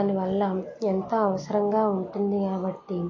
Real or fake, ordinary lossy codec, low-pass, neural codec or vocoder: real; MP3, 32 kbps; 7.2 kHz; none